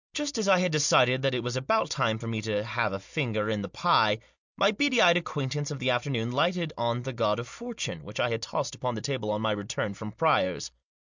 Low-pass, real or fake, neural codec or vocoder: 7.2 kHz; real; none